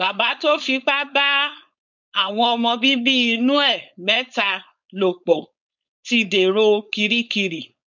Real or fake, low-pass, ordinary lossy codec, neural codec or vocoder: fake; 7.2 kHz; none; codec, 16 kHz, 4.8 kbps, FACodec